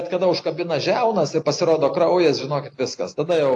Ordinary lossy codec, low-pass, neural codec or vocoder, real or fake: AAC, 48 kbps; 10.8 kHz; none; real